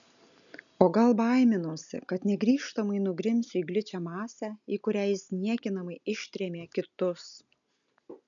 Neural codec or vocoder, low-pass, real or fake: none; 7.2 kHz; real